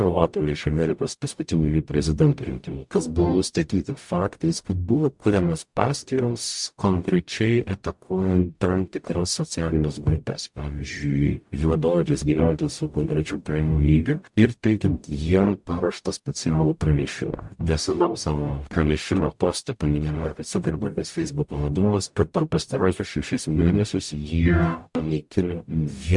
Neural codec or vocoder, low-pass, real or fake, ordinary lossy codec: codec, 44.1 kHz, 0.9 kbps, DAC; 10.8 kHz; fake; MP3, 64 kbps